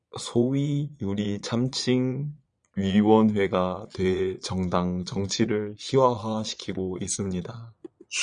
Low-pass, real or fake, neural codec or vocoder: 9.9 kHz; fake; vocoder, 22.05 kHz, 80 mel bands, Vocos